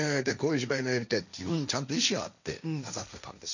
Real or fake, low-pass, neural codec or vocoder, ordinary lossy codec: fake; 7.2 kHz; codec, 16 kHz, 1.1 kbps, Voila-Tokenizer; none